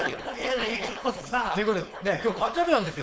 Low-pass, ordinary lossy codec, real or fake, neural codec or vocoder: none; none; fake; codec, 16 kHz, 4.8 kbps, FACodec